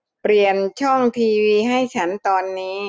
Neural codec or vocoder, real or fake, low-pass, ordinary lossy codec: none; real; none; none